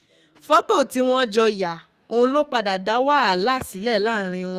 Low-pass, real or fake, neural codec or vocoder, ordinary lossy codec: 14.4 kHz; fake; codec, 44.1 kHz, 2.6 kbps, SNAC; Opus, 64 kbps